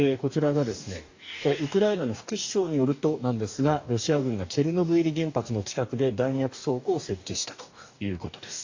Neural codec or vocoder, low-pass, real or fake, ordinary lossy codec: codec, 44.1 kHz, 2.6 kbps, DAC; 7.2 kHz; fake; AAC, 48 kbps